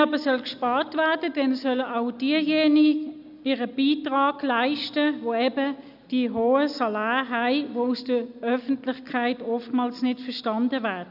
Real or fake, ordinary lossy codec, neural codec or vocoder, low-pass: real; none; none; 5.4 kHz